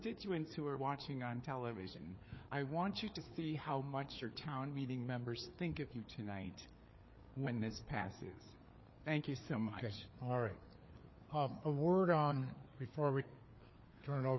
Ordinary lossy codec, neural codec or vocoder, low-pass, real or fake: MP3, 24 kbps; codec, 16 kHz, 4 kbps, FreqCodec, larger model; 7.2 kHz; fake